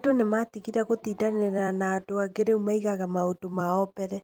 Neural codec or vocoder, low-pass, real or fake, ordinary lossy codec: vocoder, 44.1 kHz, 128 mel bands every 256 samples, BigVGAN v2; 19.8 kHz; fake; Opus, 32 kbps